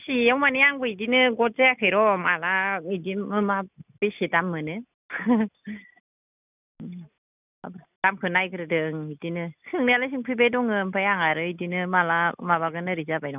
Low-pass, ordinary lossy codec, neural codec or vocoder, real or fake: 3.6 kHz; none; none; real